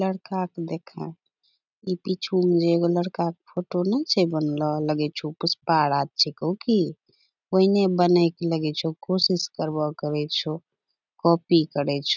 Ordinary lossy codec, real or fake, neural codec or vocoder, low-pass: none; real; none; 7.2 kHz